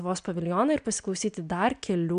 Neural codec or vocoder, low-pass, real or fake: none; 9.9 kHz; real